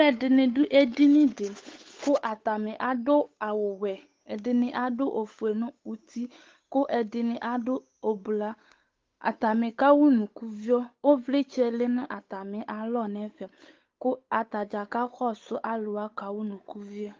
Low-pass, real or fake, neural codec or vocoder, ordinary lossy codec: 7.2 kHz; fake; codec, 16 kHz, 8 kbps, FunCodec, trained on Chinese and English, 25 frames a second; Opus, 24 kbps